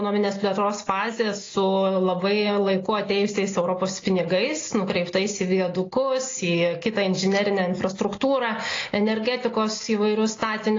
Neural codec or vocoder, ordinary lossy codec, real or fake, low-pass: none; AAC, 32 kbps; real; 7.2 kHz